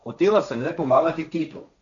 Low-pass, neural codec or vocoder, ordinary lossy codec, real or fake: 7.2 kHz; codec, 16 kHz, 1.1 kbps, Voila-Tokenizer; none; fake